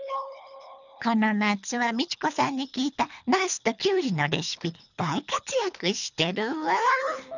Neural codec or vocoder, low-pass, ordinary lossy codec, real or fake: codec, 24 kHz, 3 kbps, HILCodec; 7.2 kHz; none; fake